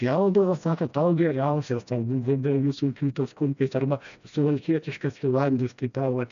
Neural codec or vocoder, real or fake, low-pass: codec, 16 kHz, 1 kbps, FreqCodec, smaller model; fake; 7.2 kHz